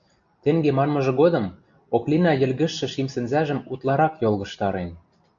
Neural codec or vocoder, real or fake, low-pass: none; real; 7.2 kHz